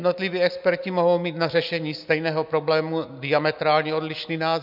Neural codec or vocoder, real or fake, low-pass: none; real; 5.4 kHz